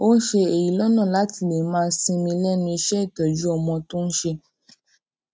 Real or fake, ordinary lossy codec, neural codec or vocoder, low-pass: real; none; none; none